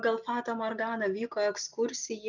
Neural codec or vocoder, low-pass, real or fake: none; 7.2 kHz; real